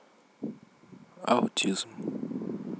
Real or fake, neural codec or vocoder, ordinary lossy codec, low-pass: real; none; none; none